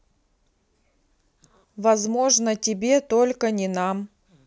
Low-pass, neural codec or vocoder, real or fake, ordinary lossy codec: none; none; real; none